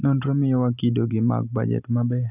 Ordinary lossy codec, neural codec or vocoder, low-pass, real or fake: none; none; 3.6 kHz; real